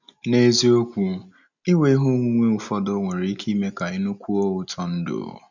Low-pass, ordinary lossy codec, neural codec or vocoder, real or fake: 7.2 kHz; none; none; real